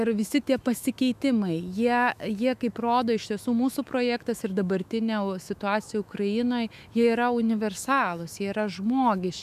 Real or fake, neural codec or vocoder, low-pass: fake; autoencoder, 48 kHz, 128 numbers a frame, DAC-VAE, trained on Japanese speech; 14.4 kHz